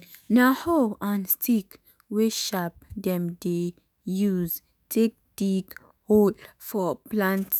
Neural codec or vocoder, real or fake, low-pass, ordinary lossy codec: autoencoder, 48 kHz, 128 numbers a frame, DAC-VAE, trained on Japanese speech; fake; none; none